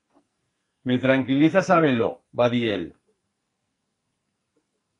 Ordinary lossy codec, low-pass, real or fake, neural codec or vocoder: AAC, 48 kbps; 10.8 kHz; fake; codec, 44.1 kHz, 2.6 kbps, SNAC